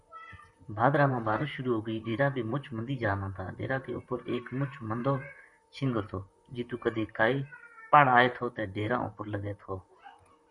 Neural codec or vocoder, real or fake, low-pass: vocoder, 44.1 kHz, 128 mel bands, Pupu-Vocoder; fake; 10.8 kHz